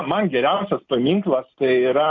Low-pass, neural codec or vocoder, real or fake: 7.2 kHz; none; real